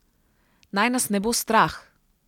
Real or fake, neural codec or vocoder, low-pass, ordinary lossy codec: real; none; 19.8 kHz; none